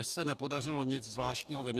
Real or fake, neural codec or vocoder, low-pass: fake; codec, 44.1 kHz, 2.6 kbps, DAC; 14.4 kHz